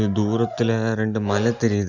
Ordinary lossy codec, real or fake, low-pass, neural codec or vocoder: none; real; 7.2 kHz; none